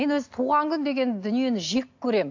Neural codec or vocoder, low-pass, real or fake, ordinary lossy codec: none; 7.2 kHz; real; AAC, 48 kbps